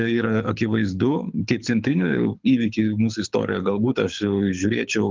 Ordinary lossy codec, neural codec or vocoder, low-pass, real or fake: Opus, 24 kbps; codec, 24 kHz, 6 kbps, HILCodec; 7.2 kHz; fake